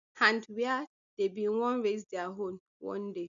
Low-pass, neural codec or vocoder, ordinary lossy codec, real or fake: 7.2 kHz; none; none; real